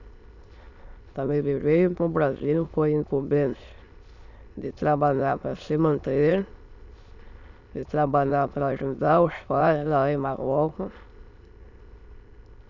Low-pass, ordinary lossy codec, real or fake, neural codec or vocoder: 7.2 kHz; none; fake; autoencoder, 22.05 kHz, a latent of 192 numbers a frame, VITS, trained on many speakers